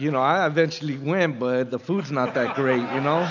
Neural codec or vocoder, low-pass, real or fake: none; 7.2 kHz; real